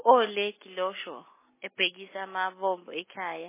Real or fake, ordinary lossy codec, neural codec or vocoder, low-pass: real; MP3, 16 kbps; none; 3.6 kHz